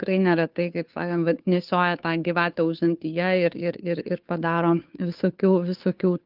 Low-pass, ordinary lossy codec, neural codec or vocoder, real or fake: 5.4 kHz; Opus, 24 kbps; codec, 16 kHz, 2 kbps, FunCodec, trained on Chinese and English, 25 frames a second; fake